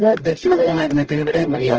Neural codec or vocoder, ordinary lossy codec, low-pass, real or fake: codec, 44.1 kHz, 0.9 kbps, DAC; Opus, 16 kbps; 7.2 kHz; fake